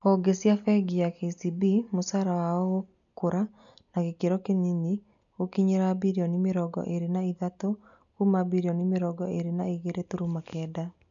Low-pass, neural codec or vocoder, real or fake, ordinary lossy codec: 7.2 kHz; none; real; none